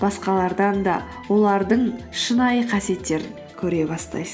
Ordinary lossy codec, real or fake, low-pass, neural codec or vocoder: none; real; none; none